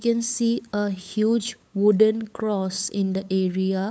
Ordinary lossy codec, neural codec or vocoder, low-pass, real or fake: none; codec, 16 kHz, 8 kbps, FunCodec, trained on LibriTTS, 25 frames a second; none; fake